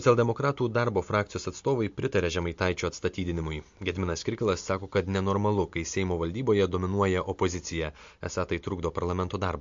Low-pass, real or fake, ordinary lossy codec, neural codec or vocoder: 7.2 kHz; real; MP3, 48 kbps; none